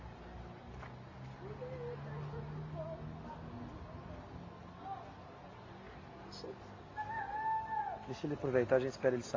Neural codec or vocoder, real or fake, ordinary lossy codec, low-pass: none; real; AAC, 32 kbps; 7.2 kHz